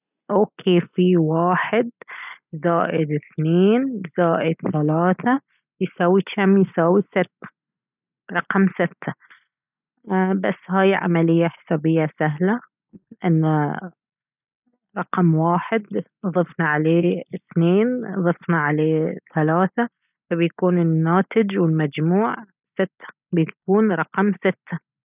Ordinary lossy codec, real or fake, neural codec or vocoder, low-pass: none; real; none; 3.6 kHz